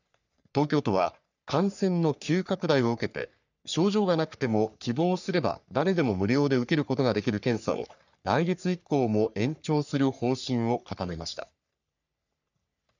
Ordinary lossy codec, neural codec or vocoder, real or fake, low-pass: none; codec, 44.1 kHz, 3.4 kbps, Pupu-Codec; fake; 7.2 kHz